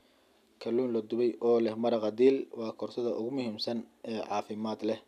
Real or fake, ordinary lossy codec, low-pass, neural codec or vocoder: real; none; 14.4 kHz; none